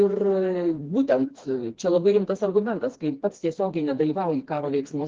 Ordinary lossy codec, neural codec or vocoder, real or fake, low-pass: Opus, 16 kbps; codec, 16 kHz, 2 kbps, FreqCodec, smaller model; fake; 7.2 kHz